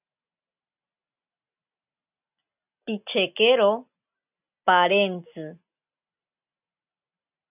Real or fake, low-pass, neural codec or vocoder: real; 3.6 kHz; none